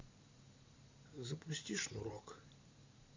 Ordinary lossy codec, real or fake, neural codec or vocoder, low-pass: MP3, 64 kbps; fake; vocoder, 44.1 kHz, 128 mel bands every 512 samples, BigVGAN v2; 7.2 kHz